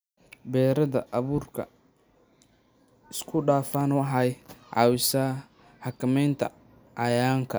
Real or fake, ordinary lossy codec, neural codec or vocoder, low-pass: real; none; none; none